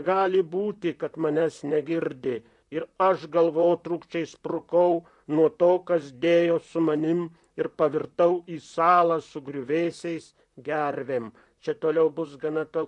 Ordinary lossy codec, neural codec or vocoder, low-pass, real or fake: MP3, 64 kbps; vocoder, 44.1 kHz, 128 mel bands, Pupu-Vocoder; 10.8 kHz; fake